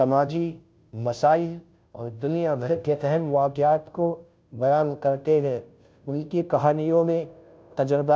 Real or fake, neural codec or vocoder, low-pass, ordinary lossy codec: fake; codec, 16 kHz, 0.5 kbps, FunCodec, trained on Chinese and English, 25 frames a second; none; none